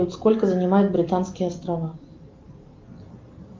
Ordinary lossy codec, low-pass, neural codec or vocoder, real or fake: Opus, 32 kbps; 7.2 kHz; none; real